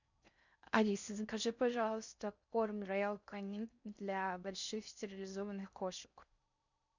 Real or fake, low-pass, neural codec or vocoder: fake; 7.2 kHz; codec, 16 kHz in and 24 kHz out, 0.6 kbps, FocalCodec, streaming, 4096 codes